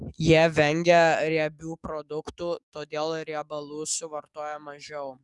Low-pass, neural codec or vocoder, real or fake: 10.8 kHz; codec, 44.1 kHz, 7.8 kbps, DAC; fake